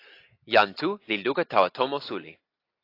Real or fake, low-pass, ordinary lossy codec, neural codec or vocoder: real; 5.4 kHz; AAC, 32 kbps; none